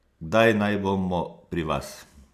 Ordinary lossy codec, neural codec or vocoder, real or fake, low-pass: none; none; real; 14.4 kHz